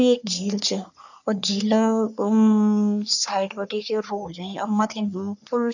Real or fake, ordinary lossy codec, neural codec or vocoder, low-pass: fake; none; codec, 44.1 kHz, 3.4 kbps, Pupu-Codec; 7.2 kHz